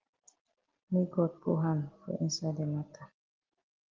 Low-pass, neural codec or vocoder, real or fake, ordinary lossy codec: 7.2 kHz; none; real; Opus, 16 kbps